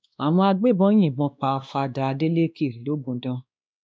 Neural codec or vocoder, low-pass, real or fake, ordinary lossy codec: codec, 16 kHz, 2 kbps, X-Codec, WavLM features, trained on Multilingual LibriSpeech; none; fake; none